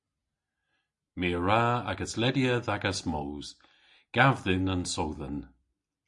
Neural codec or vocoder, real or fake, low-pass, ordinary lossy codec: none; real; 10.8 kHz; MP3, 48 kbps